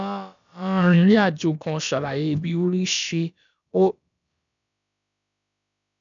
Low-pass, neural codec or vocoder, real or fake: 7.2 kHz; codec, 16 kHz, about 1 kbps, DyCAST, with the encoder's durations; fake